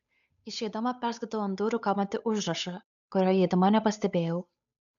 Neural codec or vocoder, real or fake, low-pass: codec, 16 kHz, 8 kbps, FunCodec, trained on Chinese and English, 25 frames a second; fake; 7.2 kHz